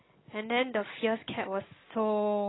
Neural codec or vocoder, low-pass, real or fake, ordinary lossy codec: none; 7.2 kHz; real; AAC, 16 kbps